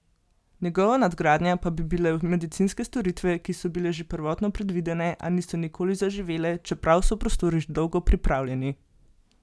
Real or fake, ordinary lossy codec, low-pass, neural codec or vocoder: real; none; none; none